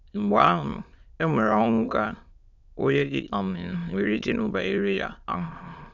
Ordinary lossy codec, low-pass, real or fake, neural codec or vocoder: none; 7.2 kHz; fake; autoencoder, 22.05 kHz, a latent of 192 numbers a frame, VITS, trained on many speakers